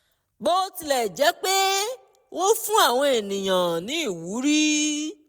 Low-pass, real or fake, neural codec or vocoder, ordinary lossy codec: none; real; none; none